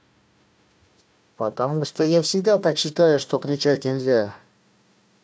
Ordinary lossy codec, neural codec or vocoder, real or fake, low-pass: none; codec, 16 kHz, 1 kbps, FunCodec, trained on Chinese and English, 50 frames a second; fake; none